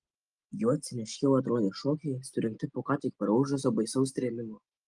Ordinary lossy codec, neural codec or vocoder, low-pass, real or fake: Opus, 24 kbps; none; 10.8 kHz; real